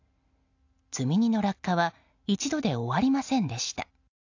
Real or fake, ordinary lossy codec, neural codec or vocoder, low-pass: real; AAC, 48 kbps; none; 7.2 kHz